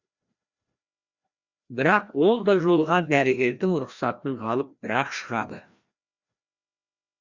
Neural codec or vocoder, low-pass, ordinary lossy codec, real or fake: codec, 16 kHz, 1 kbps, FreqCodec, larger model; 7.2 kHz; Opus, 64 kbps; fake